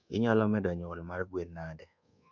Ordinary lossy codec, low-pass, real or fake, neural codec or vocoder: none; 7.2 kHz; fake; codec, 24 kHz, 1.2 kbps, DualCodec